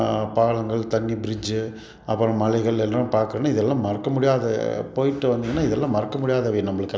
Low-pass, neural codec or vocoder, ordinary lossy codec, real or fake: none; none; none; real